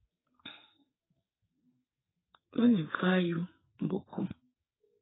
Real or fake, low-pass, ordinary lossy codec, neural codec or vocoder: fake; 7.2 kHz; AAC, 16 kbps; codec, 44.1 kHz, 2.6 kbps, SNAC